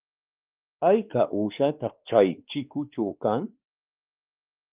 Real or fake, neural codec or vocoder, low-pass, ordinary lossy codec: fake; codec, 16 kHz, 2 kbps, X-Codec, WavLM features, trained on Multilingual LibriSpeech; 3.6 kHz; Opus, 24 kbps